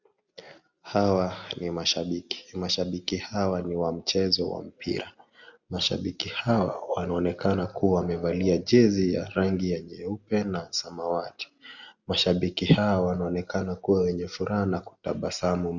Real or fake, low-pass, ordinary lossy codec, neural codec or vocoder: real; 7.2 kHz; Opus, 64 kbps; none